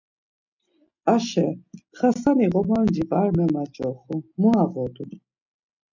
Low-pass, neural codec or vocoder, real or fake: 7.2 kHz; none; real